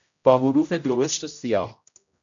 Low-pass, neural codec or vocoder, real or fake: 7.2 kHz; codec, 16 kHz, 0.5 kbps, X-Codec, HuBERT features, trained on general audio; fake